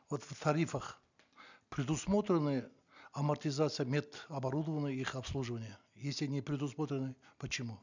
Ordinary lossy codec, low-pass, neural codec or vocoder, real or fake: none; 7.2 kHz; none; real